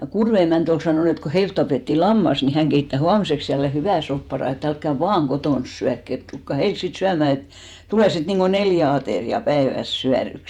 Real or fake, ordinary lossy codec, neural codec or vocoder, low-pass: fake; none; vocoder, 48 kHz, 128 mel bands, Vocos; 19.8 kHz